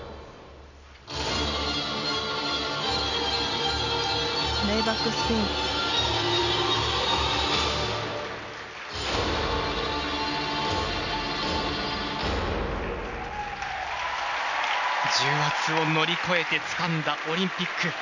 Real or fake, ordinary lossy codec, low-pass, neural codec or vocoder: real; none; 7.2 kHz; none